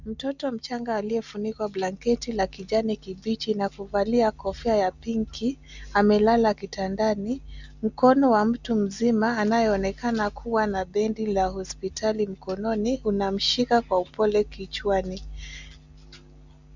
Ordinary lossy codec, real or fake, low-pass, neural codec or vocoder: Opus, 64 kbps; real; 7.2 kHz; none